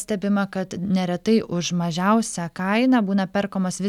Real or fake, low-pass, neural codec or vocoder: real; 19.8 kHz; none